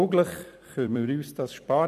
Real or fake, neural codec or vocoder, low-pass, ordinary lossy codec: fake; vocoder, 44.1 kHz, 128 mel bands every 256 samples, BigVGAN v2; 14.4 kHz; none